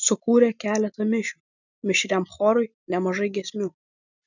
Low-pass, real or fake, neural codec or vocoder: 7.2 kHz; real; none